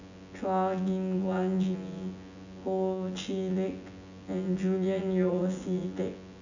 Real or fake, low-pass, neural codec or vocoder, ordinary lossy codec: fake; 7.2 kHz; vocoder, 24 kHz, 100 mel bands, Vocos; none